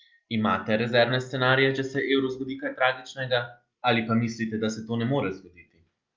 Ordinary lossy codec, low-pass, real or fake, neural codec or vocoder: Opus, 24 kbps; 7.2 kHz; real; none